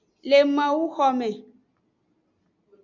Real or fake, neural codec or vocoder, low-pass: real; none; 7.2 kHz